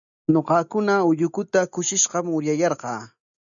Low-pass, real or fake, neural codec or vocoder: 7.2 kHz; real; none